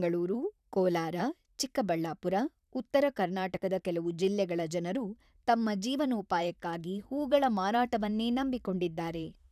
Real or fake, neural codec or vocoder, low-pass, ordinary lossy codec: fake; vocoder, 44.1 kHz, 128 mel bands, Pupu-Vocoder; 14.4 kHz; none